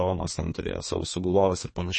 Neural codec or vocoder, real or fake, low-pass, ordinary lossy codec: codec, 32 kHz, 1.9 kbps, SNAC; fake; 10.8 kHz; MP3, 32 kbps